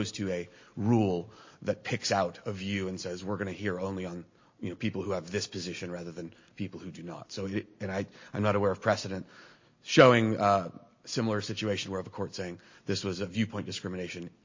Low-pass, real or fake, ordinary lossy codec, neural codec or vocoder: 7.2 kHz; real; MP3, 32 kbps; none